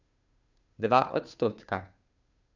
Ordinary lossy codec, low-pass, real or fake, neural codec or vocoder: none; 7.2 kHz; fake; codec, 16 kHz, 2 kbps, FunCodec, trained on Chinese and English, 25 frames a second